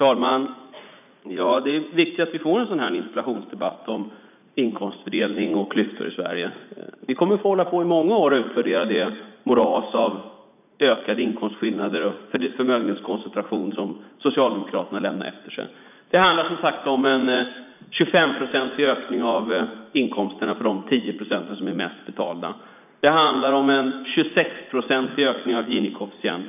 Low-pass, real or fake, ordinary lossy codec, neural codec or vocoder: 3.6 kHz; fake; none; vocoder, 44.1 kHz, 80 mel bands, Vocos